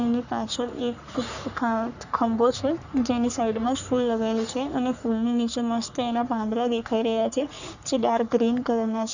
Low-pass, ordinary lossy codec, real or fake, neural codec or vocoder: 7.2 kHz; none; fake; codec, 44.1 kHz, 3.4 kbps, Pupu-Codec